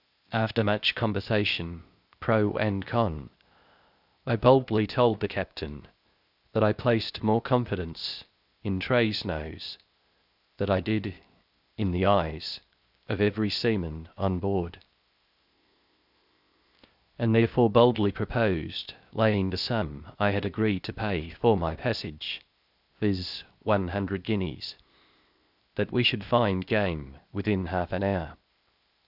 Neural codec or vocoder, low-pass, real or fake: codec, 16 kHz, 0.8 kbps, ZipCodec; 5.4 kHz; fake